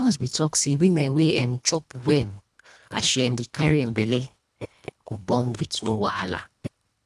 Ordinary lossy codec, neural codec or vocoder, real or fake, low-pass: none; codec, 24 kHz, 1.5 kbps, HILCodec; fake; none